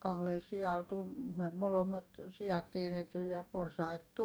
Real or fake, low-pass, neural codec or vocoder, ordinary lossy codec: fake; none; codec, 44.1 kHz, 2.6 kbps, DAC; none